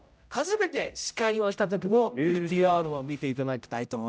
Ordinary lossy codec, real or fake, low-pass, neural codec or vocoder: none; fake; none; codec, 16 kHz, 0.5 kbps, X-Codec, HuBERT features, trained on general audio